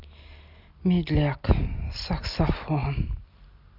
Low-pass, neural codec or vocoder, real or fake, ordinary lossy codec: 5.4 kHz; none; real; Opus, 64 kbps